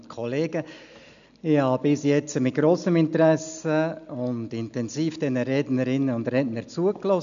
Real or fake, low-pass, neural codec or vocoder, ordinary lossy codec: real; 7.2 kHz; none; none